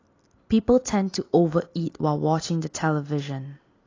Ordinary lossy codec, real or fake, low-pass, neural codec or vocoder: AAC, 32 kbps; real; 7.2 kHz; none